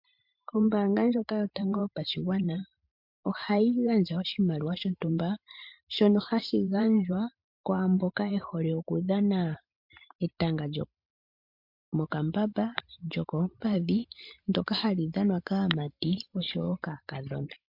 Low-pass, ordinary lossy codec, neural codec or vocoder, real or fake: 5.4 kHz; MP3, 48 kbps; vocoder, 44.1 kHz, 128 mel bands every 512 samples, BigVGAN v2; fake